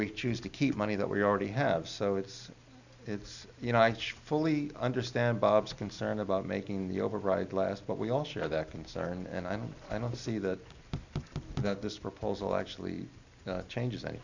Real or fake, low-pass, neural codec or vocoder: real; 7.2 kHz; none